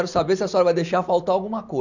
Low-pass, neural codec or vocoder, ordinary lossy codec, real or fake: 7.2 kHz; none; none; real